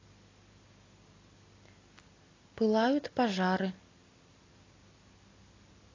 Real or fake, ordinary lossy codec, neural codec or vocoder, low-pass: real; AAC, 32 kbps; none; 7.2 kHz